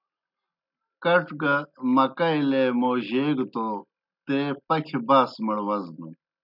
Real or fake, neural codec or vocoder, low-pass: real; none; 5.4 kHz